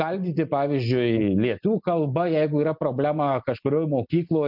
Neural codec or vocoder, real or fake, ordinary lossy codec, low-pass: none; real; MP3, 48 kbps; 5.4 kHz